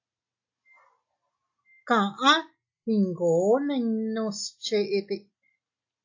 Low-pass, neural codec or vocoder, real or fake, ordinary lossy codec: 7.2 kHz; none; real; AAC, 48 kbps